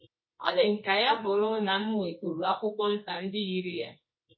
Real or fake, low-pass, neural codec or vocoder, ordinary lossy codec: fake; 7.2 kHz; codec, 24 kHz, 0.9 kbps, WavTokenizer, medium music audio release; MP3, 24 kbps